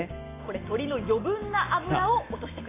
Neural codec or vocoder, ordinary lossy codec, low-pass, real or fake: none; AAC, 16 kbps; 3.6 kHz; real